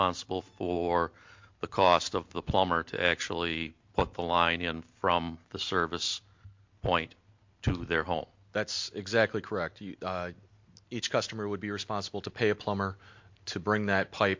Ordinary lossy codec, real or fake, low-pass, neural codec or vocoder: MP3, 48 kbps; real; 7.2 kHz; none